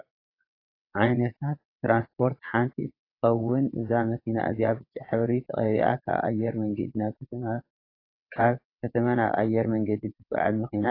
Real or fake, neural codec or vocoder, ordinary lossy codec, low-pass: fake; vocoder, 22.05 kHz, 80 mel bands, WaveNeXt; AAC, 32 kbps; 5.4 kHz